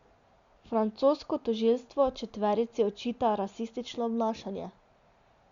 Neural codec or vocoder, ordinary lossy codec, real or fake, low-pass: none; Opus, 64 kbps; real; 7.2 kHz